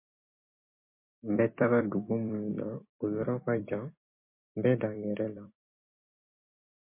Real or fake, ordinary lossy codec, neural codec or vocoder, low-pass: fake; MP3, 24 kbps; vocoder, 44.1 kHz, 128 mel bands every 256 samples, BigVGAN v2; 3.6 kHz